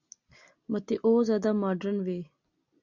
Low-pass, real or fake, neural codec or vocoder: 7.2 kHz; real; none